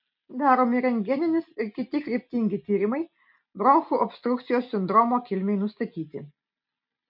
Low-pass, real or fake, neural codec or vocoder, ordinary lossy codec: 5.4 kHz; real; none; MP3, 48 kbps